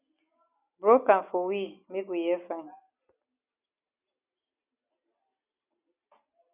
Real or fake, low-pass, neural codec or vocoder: real; 3.6 kHz; none